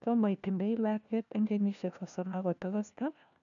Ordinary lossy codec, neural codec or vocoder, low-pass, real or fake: none; codec, 16 kHz, 1 kbps, FunCodec, trained on LibriTTS, 50 frames a second; 7.2 kHz; fake